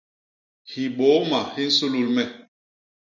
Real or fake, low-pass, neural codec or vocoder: real; 7.2 kHz; none